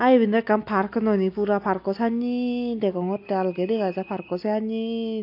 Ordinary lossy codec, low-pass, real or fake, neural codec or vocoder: AAC, 32 kbps; 5.4 kHz; real; none